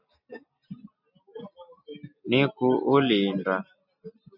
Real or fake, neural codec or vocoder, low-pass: real; none; 5.4 kHz